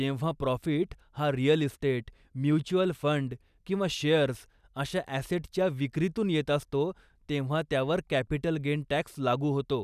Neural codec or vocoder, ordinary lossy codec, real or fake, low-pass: none; none; real; 14.4 kHz